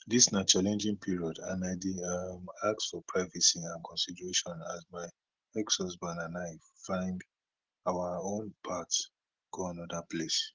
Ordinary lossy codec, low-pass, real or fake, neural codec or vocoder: Opus, 16 kbps; 7.2 kHz; real; none